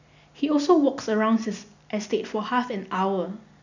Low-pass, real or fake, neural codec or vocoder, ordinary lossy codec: 7.2 kHz; real; none; none